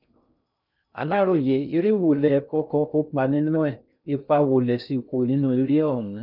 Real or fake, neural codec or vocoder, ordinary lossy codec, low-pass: fake; codec, 16 kHz in and 24 kHz out, 0.6 kbps, FocalCodec, streaming, 2048 codes; none; 5.4 kHz